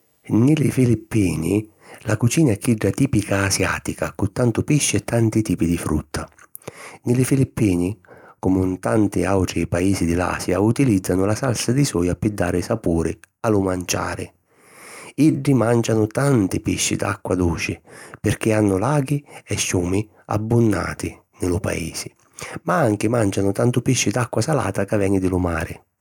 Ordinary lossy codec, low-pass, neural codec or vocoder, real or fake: none; 19.8 kHz; none; real